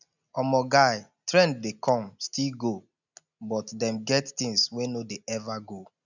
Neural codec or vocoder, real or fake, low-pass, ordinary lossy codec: none; real; 7.2 kHz; none